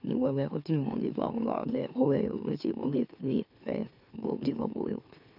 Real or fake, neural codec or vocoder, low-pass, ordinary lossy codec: fake; autoencoder, 44.1 kHz, a latent of 192 numbers a frame, MeloTTS; 5.4 kHz; none